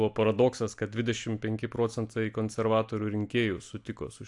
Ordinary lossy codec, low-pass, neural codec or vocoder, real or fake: AAC, 64 kbps; 10.8 kHz; none; real